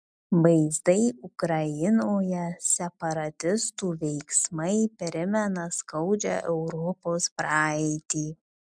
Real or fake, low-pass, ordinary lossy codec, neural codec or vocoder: real; 9.9 kHz; MP3, 96 kbps; none